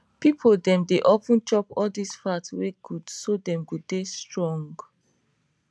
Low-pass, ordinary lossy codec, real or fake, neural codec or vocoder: none; none; real; none